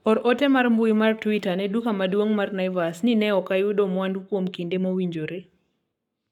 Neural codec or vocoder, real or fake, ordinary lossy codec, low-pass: codec, 44.1 kHz, 7.8 kbps, Pupu-Codec; fake; none; 19.8 kHz